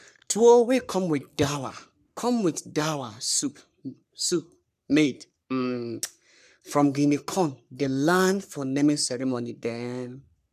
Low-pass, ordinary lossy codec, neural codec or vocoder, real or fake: 14.4 kHz; none; codec, 44.1 kHz, 3.4 kbps, Pupu-Codec; fake